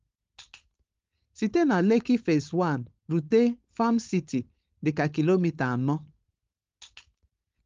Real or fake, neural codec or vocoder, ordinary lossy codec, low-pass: fake; codec, 16 kHz, 4.8 kbps, FACodec; Opus, 32 kbps; 7.2 kHz